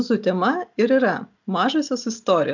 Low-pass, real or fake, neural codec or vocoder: 7.2 kHz; real; none